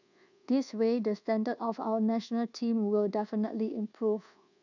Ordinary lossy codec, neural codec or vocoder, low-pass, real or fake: none; codec, 24 kHz, 1.2 kbps, DualCodec; 7.2 kHz; fake